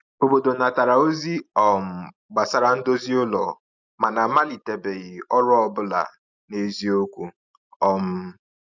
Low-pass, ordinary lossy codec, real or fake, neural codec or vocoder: 7.2 kHz; none; real; none